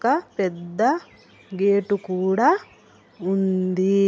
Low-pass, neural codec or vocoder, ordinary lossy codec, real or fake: none; none; none; real